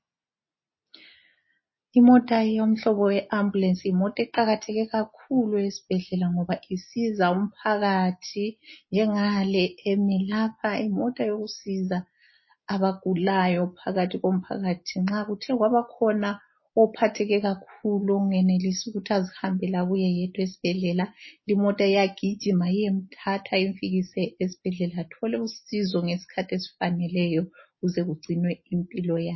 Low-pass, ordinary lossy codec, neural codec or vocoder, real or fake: 7.2 kHz; MP3, 24 kbps; none; real